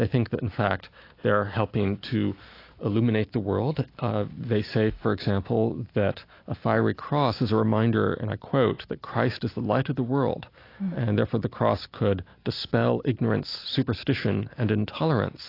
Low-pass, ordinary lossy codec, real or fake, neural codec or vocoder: 5.4 kHz; AAC, 32 kbps; real; none